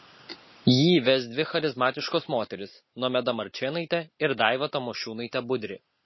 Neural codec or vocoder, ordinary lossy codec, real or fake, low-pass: none; MP3, 24 kbps; real; 7.2 kHz